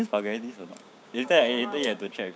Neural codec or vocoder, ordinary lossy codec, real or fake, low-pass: codec, 16 kHz, 6 kbps, DAC; none; fake; none